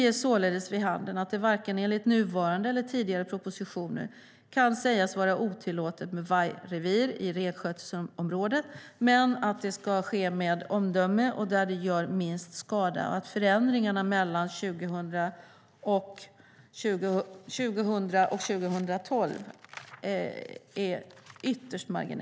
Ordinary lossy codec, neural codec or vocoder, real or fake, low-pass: none; none; real; none